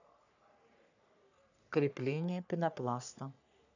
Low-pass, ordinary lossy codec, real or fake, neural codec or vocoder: 7.2 kHz; none; fake; codec, 44.1 kHz, 3.4 kbps, Pupu-Codec